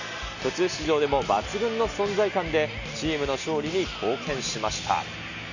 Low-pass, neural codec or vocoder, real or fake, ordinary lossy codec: 7.2 kHz; none; real; none